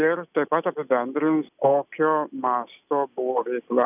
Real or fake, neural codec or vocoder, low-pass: real; none; 3.6 kHz